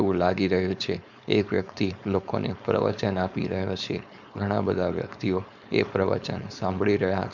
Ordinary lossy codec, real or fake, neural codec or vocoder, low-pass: none; fake; codec, 16 kHz, 4.8 kbps, FACodec; 7.2 kHz